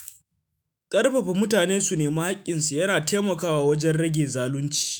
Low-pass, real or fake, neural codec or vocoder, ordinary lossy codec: none; fake; autoencoder, 48 kHz, 128 numbers a frame, DAC-VAE, trained on Japanese speech; none